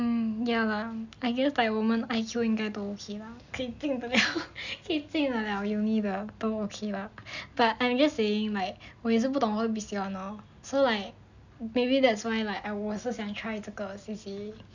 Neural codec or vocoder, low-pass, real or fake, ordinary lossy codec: none; 7.2 kHz; real; none